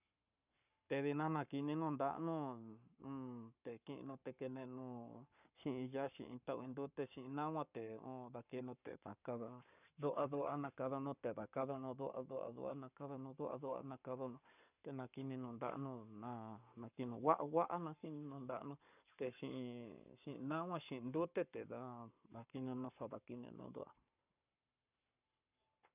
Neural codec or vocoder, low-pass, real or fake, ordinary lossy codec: codec, 44.1 kHz, 7.8 kbps, Pupu-Codec; 3.6 kHz; fake; none